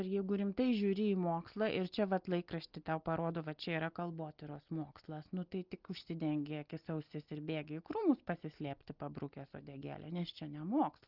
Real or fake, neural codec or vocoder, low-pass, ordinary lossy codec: real; none; 5.4 kHz; Opus, 32 kbps